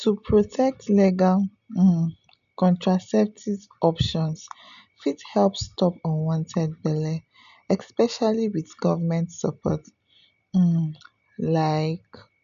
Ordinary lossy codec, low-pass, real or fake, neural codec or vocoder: none; 7.2 kHz; real; none